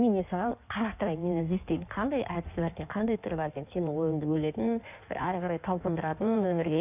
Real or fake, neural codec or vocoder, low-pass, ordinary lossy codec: fake; codec, 16 kHz in and 24 kHz out, 1.1 kbps, FireRedTTS-2 codec; 3.6 kHz; none